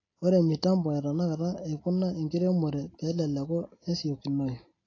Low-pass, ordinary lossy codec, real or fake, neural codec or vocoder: 7.2 kHz; AAC, 32 kbps; real; none